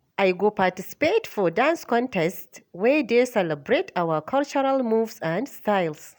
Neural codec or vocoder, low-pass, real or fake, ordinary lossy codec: none; none; real; none